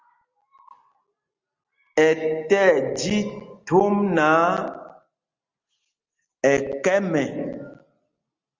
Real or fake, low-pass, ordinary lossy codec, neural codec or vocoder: real; 7.2 kHz; Opus, 32 kbps; none